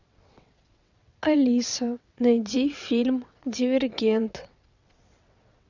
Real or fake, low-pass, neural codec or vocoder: fake; 7.2 kHz; vocoder, 22.05 kHz, 80 mel bands, WaveNeXt